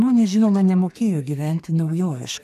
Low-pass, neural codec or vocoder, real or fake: 14.4 kHz; codec, 32 kHz, 1.9 kbps, SNAC; fake